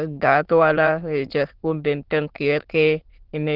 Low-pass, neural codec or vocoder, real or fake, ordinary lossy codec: 5.4 kHz; autoencoder, 22.05 kHz, a latent of 192 numbers a frame, VITS, trained on many speakers; fake; Opus, 16 kbps